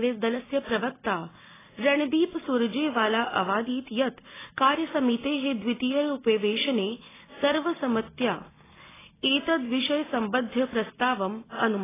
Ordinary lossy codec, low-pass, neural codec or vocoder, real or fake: AAC, 16 kbps; 3.6 kHz; none; real